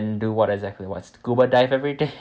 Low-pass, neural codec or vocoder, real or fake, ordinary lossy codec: none; none; real; none